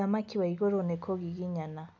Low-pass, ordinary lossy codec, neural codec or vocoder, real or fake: none; none; none; real